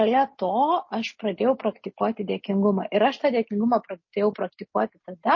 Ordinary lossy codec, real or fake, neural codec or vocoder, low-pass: MP3, 32 kbps; real; none; 7.2 kHz